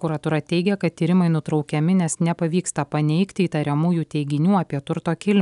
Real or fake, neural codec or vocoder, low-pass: real; none; 10.8 kHz